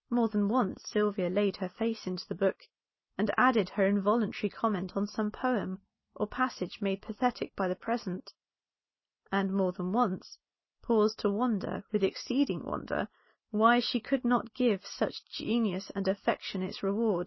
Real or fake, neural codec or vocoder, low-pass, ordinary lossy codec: real; none; 7.2 kHz; MP3, 24 kbps